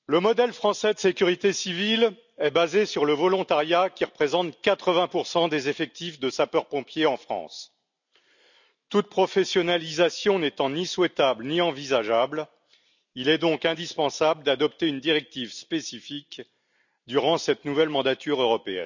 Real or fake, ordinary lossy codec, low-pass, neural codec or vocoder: real; none; 7.2 kHz; none